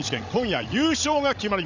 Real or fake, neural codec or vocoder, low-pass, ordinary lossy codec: fake; codec, 16 kHz, 16 kbps, FreqCodec, larger model; 7.2 kHz; none